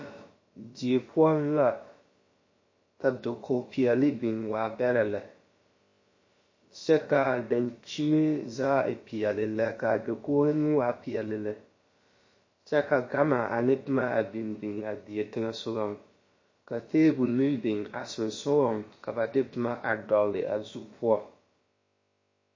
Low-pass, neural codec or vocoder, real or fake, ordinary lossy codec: 7.2 kHz; codec, 16 kHz, about 1 kbps, DyCAST, with the encoder's durations; fake; MP3, 32 kbps